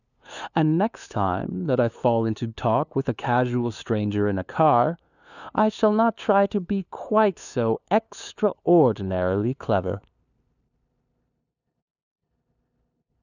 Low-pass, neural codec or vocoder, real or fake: 7.2 kHz; codec, 16 kHz, 2 kbps, FunCodec, trained on LibriTTS, 25 frames a second; fake